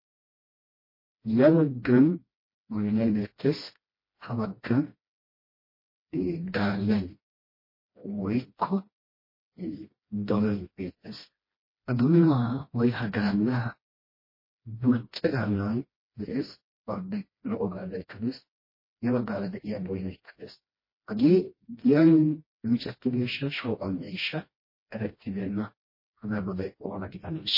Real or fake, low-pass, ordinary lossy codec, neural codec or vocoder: fake; 5.4 kHz; MP3, 24 kbps; codec, 16 kHz, 1 kbps, FreqCodec, smaller model